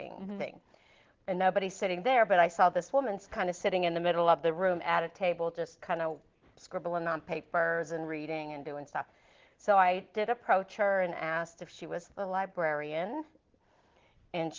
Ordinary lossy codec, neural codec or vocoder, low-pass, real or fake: Opus, 16 kbps; none; 7.2 kHz; real